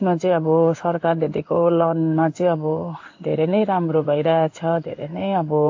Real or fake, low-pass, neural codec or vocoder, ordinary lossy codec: fake; 7.2 kHz; codec, 16 kHz in and 24 kHz out, 1 kbps, XY-Tokenizer; AAC, 48 kbps